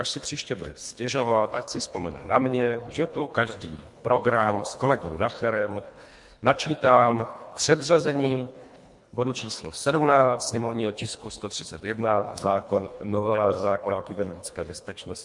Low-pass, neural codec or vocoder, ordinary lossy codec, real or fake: 10.8 kHz; codec, 24 kHz, 1.5 kbps, HILCodec; MP3, 64 kbps; fake